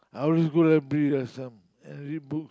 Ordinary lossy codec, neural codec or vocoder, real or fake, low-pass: none; none; real; none